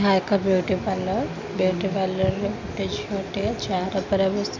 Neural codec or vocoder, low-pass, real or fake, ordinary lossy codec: none; 7.2 kHz; real; MP3, 64 kbps